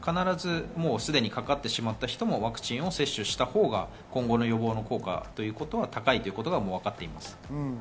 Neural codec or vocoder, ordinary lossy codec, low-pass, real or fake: none; none; none; real